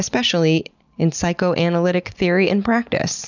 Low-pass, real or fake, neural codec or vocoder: 7.2 kHz; real; none